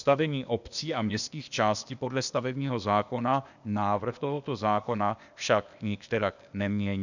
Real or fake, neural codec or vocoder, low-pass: fake; codec, 16 kHz, 0.8 kbps, ZipCodec; 7.2 kHz